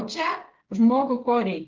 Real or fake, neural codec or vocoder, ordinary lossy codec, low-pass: fake; codec, 16 kHz, 16 kbps, FreqCodec, smaller model; Opus, 16 kbps; 7.2 kHz